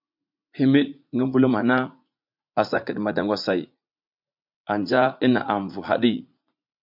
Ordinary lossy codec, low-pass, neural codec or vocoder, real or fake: MP3, 48 kbps; 5.4 kHz; vocoder, 44.1 kHz, 80 mel bands, Vocos; fake